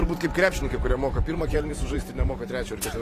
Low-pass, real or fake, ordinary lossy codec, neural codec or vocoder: 14.4 kHz; fake; AAC, 48 kbps; vocoder, 44.1 kHz, 128 mel bands every 512 samples, BigVGAN v2